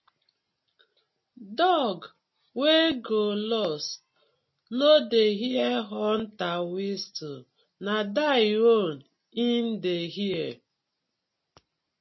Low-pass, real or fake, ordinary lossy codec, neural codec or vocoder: 7.2 kHz; real; MP3, 24 kbps; none